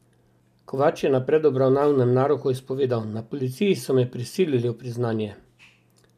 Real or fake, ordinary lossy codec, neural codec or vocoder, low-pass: real; none; none; 14.4 kHz